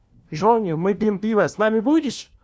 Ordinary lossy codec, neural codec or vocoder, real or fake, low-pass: none; codec, 16 kHz, 1 kbps, FunCodec, trained on LibriTTS, 50 frames a second; fake; none